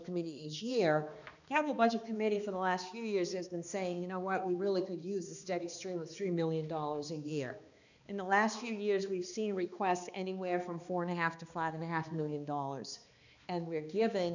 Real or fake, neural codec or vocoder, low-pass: fake; codec, 16 kHz, 2 kbps, X-Codec, HuBERT features, trained on balanced general audio; 7.2 kHz